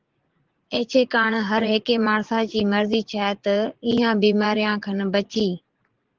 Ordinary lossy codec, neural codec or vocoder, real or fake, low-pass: Opus, 16 kbps; vocoder, 44.1 kHz, 80 mel bands, Vocos; fake; 7.2 kHz